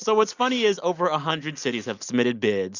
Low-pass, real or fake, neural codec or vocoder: 7.2 kHz; real; none